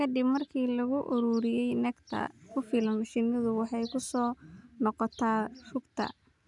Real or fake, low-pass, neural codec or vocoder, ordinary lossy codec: real; 10.8 kHz; none; none